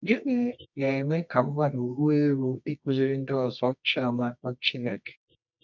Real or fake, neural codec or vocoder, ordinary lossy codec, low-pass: fake; codec, 24 kHz, 0.9 kbps, WavTokenizer, medium music audio release; none; 7.2 kHz